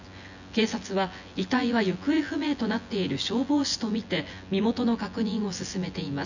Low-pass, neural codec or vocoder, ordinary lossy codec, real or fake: 7.2 kHz; vocoder, 24 kHz, 100 mel bands, Vocos; none; fake